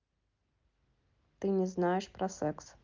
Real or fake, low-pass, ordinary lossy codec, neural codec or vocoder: real; 7.2 kHz; Opus, 24 kbps; none